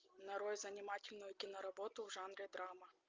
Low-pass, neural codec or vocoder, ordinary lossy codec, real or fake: 7.2 kHz; none; Opus, 24 kbps; real